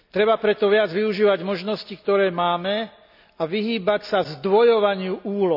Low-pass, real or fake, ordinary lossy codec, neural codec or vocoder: 5.4 kHz; real; none; none